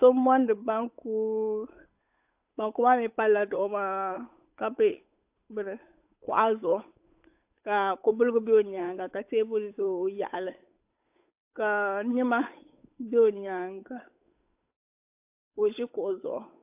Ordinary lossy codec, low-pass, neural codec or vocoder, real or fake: AAC, 32 kbps; 3.6 kHz; codec, 16 kHz, 8 kbps, FunCodec, trained on Chinese and English, 25 frames a second; fake